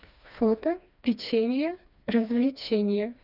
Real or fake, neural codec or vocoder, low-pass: fake; codec, 16 kHz, 2 kbps, FreqCodec, smaller model; 5.4 kHz